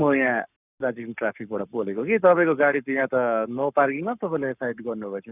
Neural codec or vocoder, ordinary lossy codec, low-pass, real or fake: codec, 44.1 kHz, 7.8 kbps, Pupu-Codec; none; 3.6 kHz; fake